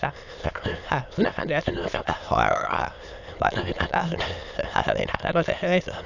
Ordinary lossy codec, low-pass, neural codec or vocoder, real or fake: none; 7.2 kHz; autoencoder, 22.05 kHz, a latent of 192 numbers a frame, VITS, trained on many speakers; fake